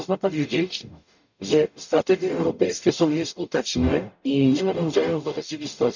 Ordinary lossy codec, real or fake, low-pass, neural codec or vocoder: none; fake; 7.2 kHz; codec, 44.1 kHz, 0.9 kbps, DAC